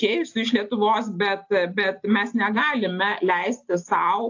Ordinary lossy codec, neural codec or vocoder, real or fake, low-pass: AAC, 48 kbps; none; real; 7.2 kHz